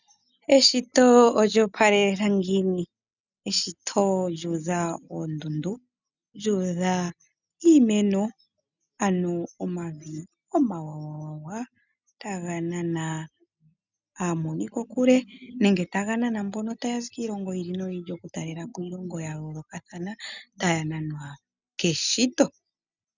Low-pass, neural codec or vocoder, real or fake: 7.2 kHz; none; real